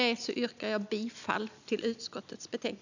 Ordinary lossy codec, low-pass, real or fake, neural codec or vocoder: none; 7.2 kHz; real; none